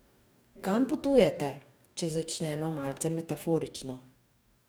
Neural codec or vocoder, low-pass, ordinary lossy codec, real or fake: codec, 44.1 kHz, 2.6 kbps, DAC; none; none; fake